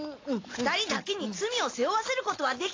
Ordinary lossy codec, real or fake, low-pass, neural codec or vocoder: AAC, 32 kbps; real; 7.2 kHz; none